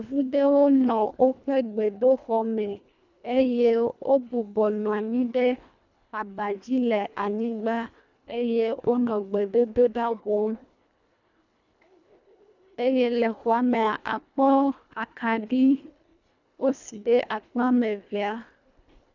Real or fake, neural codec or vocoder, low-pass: fake; codec, 24 kHz, 1.5 kbps, HILCodec; 7.2 kHz